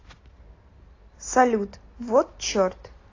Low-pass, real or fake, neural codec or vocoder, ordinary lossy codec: 7.2 kHz; real; none; AAC, 32 kbps